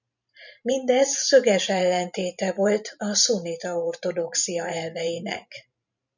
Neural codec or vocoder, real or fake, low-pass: vocoder, 44.1 kHz, 128 mel bands every 512 samples, BigVGAN v2; fake; 7.2 kHz